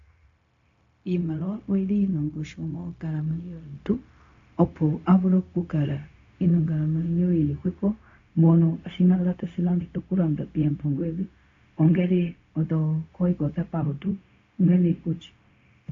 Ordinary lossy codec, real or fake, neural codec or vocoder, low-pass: AAC, 48 kbps; fake; codec, 16 kHz, 0.4 kbps, LongCat-Audio-Codec; 7.2 kHz